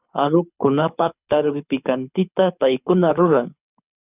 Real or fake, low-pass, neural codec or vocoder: fake; 3.6 kHz; codec, 24 kHz, 6 kbps, HILCodec